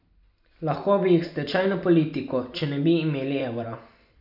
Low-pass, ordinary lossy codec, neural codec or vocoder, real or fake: 5.4 kHz; none; none; real